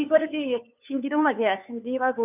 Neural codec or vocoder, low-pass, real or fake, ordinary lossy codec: codec, 16 kHz, 2 kbps, FunCodec, trained on LibriTTS, 25 frames a second; 3.6 kHz; fake; none